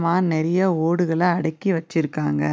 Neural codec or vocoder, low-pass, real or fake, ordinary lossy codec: none; none; real; none